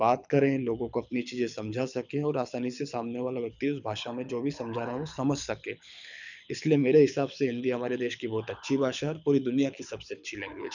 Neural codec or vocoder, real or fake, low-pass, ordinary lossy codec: codec, 24 kHz, 6 kbps, HILCodec; fake; 7.2 kHz; none